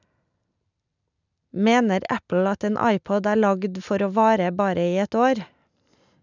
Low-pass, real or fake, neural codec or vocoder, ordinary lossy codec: 7.2 kHz; real; none; none